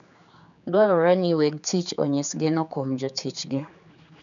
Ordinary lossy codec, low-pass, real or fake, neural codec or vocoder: none; 7.2 kHz; fake; codec, 16 kHz, 4 kbps, X-Codec, HuBERT features, trained on general audio